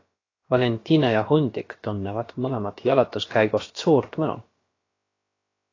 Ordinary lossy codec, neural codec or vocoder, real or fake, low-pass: AAC, 32 kbps; codec, 16 kHz, about 1 kbps, DyCAST, with the encoder's durations; fake; 7.2 kHz